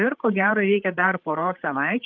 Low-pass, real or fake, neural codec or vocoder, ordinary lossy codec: 7.2 kHz; fake; codec, 16 kHz, 8 kbps, FreqCodec, larger model; Opus, 24 kbps